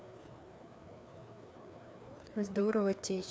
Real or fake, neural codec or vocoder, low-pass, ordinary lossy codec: fake; codec, 16 kHz, 2 kbps, FreqCodec, larger model; none; none